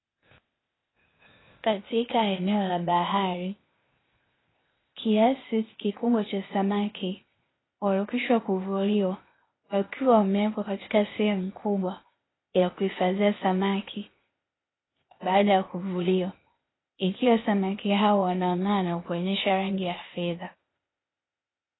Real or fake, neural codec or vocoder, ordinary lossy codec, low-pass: fake; codec, 16 kHz, 0.8 kbps, ZipCodec; AAC, 16 kbps; 7.2 kHz